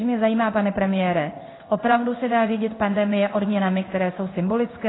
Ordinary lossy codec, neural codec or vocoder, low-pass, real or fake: AAC, 16 kbps; codec, 16 kHz in and 24 kHz out, 1 kbps, XY-Tokenizer; 7.2 kHz; fake